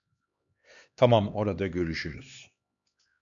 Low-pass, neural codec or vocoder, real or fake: 7.2 kHz; codec, 16 kHz, 2 kbps, X-Codec, HuBERT features, trained on LibriSpeech; fake